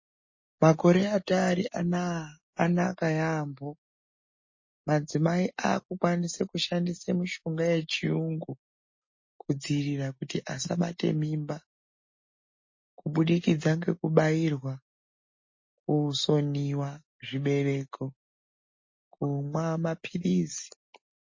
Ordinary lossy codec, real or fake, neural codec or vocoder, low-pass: MP3, 32 kbps; real; none; 7.2 kHz